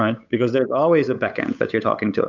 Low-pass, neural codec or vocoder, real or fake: 7.2 kHz; codec, 16 kHz, 8 kbps, FunCodec, trained on Chinese and English, 25 frames a second; fake